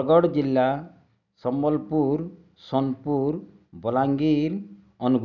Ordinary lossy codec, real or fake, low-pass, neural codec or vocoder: none; real; 7.2 kHz; none